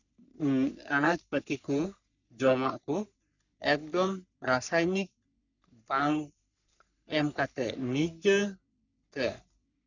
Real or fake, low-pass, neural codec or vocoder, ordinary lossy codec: fake; 7.2 kHz; codec, 44.1 kHz, 3.4 kbps, Pupu-Codec; none